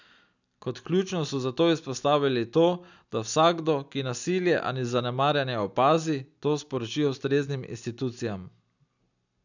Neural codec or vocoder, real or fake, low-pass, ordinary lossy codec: none; real; 7.2 kHz; none